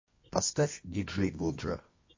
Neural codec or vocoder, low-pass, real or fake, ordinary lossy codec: codec, 24 kHz, 0.9 kbps, WavTokenizer, medium music audio release; 7.2 kHz; fake; MP3, 32 kbps